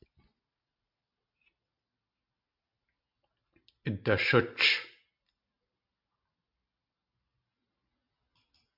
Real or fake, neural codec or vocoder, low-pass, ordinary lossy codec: real; none; 5.4 kHz; MP3, 48 kbps